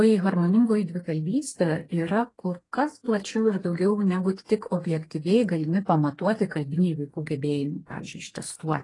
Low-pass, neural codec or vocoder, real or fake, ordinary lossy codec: 10.8 kHz; codec, 32 kHz, 1.9 kbps, SNAC; fake; AAC, 32 kbps